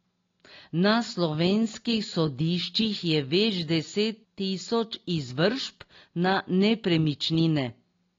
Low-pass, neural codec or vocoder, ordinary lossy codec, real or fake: 7.2 kHz; none; AAC, 32 kbps; real